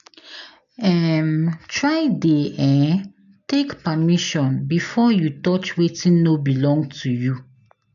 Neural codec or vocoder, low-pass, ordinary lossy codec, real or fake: none; 7.2 kHz; none; real